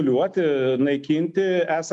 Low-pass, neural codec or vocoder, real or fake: 10.8 kHz; none; real